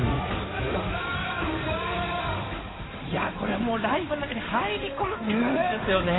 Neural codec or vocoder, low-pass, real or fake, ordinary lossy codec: codec, 16 kHz in and 24 kHz out, 2.2 kbps, FireRedTTS-2 codec; 7.2 kHz; fake; AAC, 16 kbps